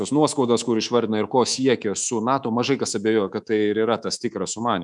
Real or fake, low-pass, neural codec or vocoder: fake; 10.8 kHz; codec, 24 kHz, 3.1 kbps, DualCodec